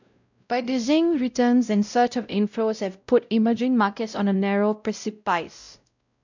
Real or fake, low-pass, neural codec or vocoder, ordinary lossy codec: fake; 7.2 kHz; codec, 16 kHz, 0.5 kbps, X-Codec, WavLM features, trained on Multilingual LibriSpeech; none